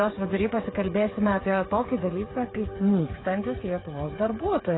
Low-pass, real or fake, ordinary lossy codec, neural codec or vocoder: 7.2 kHz; fake; AAC, 16 kbps; codec, 16 kHz, 8 kbps, FreqCodec, smaller model